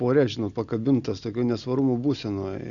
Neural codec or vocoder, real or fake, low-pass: none; real; 7.2 kHz